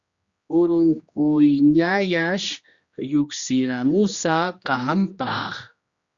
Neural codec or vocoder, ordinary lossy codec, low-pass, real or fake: codec, 16 kHz, 1 kbps, X-Codec, HuBERT features, trained on general audio; Opus, 64 kbps; 7.2 kHz; fake